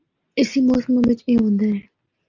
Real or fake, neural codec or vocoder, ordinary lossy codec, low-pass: real; none; Opus, 32 kbps; 7.2 kHz